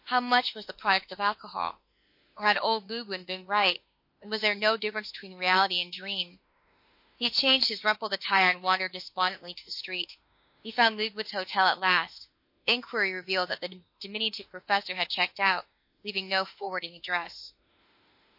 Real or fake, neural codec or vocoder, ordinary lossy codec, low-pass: fake; autoencoder, 48 kHz, 32 numbers a frame, DAC-VAE, trained on Japanese speech; MP3, 32 kbps; 5.4 kHz